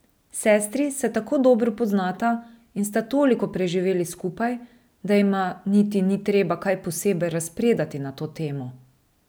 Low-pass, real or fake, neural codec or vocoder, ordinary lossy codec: none; real; none; none